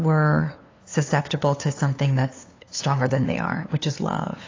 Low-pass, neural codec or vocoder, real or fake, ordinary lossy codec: 7.2 kHz; codec, 16 kHz, 2 kbps, FunCodec, trained on LibriTTS, 25 frames a second; fake; AAC, 32 kbps